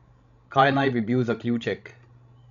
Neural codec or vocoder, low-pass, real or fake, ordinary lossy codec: codec, 16 kHz, 16 kbps, FreqCodec, larger model; 7.2 kHz; fake; none